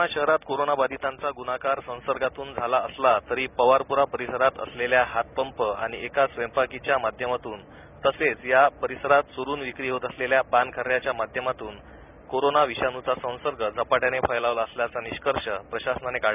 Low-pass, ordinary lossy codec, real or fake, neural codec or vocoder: 3.6 kHz; none; real; none